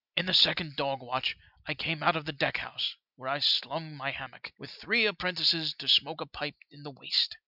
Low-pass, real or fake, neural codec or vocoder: 5.4 kHz; real; none